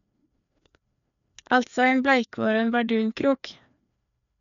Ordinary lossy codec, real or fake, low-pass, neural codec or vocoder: none; fake; 7.2 kHz; codec, 16 kHz, 2 kbps, FreqCodec, larger model